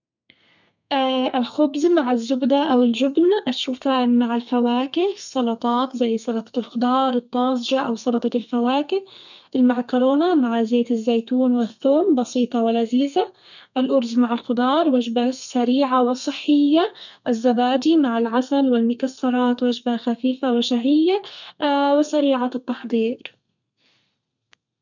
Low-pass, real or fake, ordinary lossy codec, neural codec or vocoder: 7.2 kHz; fake; none; codec, 32 kHz, 1.9 kbps, SNAC